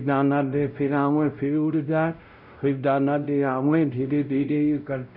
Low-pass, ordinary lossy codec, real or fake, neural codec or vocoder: 5.4 kHz; none; fake; codec, 16 kHz, 0.5 kbps, X-Codec, WavLM features, trained on Multilingual LibriSpeech